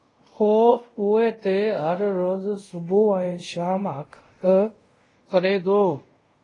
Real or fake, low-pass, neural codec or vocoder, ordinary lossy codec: fake; 10.8 kHz; codec, 24 kHz, 0.5 kbps, DualCodec; AAC, 32 kbps